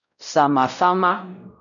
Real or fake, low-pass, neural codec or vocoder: fake; 7.2 kHz; codec, 16 kHz, 0.5 kbps, X-Codec, WavLM features, trained on Multilingual LibriSpeech